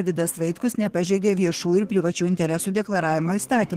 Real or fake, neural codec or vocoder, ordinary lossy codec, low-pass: fake; codec, 32 kHz, 1.9 kbps, SNAC; Opus, 16 kbps; 14.4 kHz